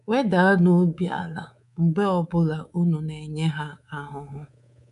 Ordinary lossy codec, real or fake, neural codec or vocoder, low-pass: none; fake; codec, 24 kHz, 3.1 kbps, DualCodec; 10.8 kHz